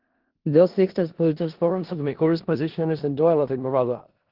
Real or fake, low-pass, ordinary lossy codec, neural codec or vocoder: fake; 5.4 kHz; Opus, 16 kbps; codec, 16 kHz in and 24 kHz out, 0.4 kbps, LongCat-Audio-Codec, four codebook decoder